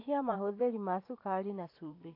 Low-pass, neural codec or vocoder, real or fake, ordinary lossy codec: 5.4 kHz; vocoder, 44.1 kHz, 128 mel bands every 512 samples, BigVGAN v2; fake; AAC, 32 kbps